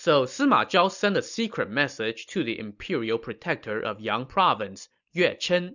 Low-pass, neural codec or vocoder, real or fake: 7.2 kHz; none; real